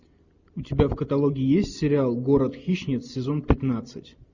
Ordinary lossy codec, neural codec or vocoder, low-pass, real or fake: Opus, 64 kbps; none; 7.2 kHz; real